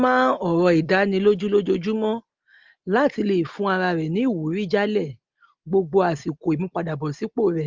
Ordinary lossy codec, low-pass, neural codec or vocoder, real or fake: Opus, 32 kbps; 7.2 kHz; none; real